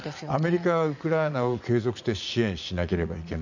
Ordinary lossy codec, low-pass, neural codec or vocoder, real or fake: none; 7.2 kHz; vocoder, 44.1 kHz, 80 mel bands, Vocos; fake